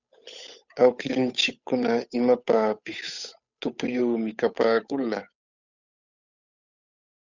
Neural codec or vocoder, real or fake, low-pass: codec, 16 kHz, 8 kbps, FunCodec, trained on Chinese and English, 25 frames a second; fake; 7.2 kHz